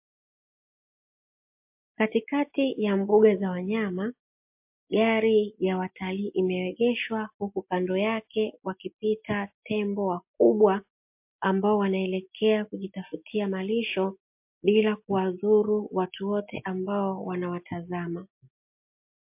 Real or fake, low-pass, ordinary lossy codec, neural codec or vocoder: real; 3.6 kHz; MP3, 32 kbps; none